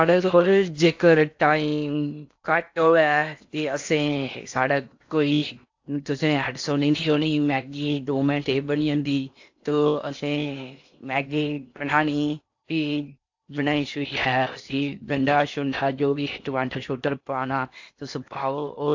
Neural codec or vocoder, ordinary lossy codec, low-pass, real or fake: codec, 16 kHz in and 24 kHz out, 0.8 kbps, FocalCodec, streaming, 65536 codes; AAC, 48 kbps; 7.2 kHz; fake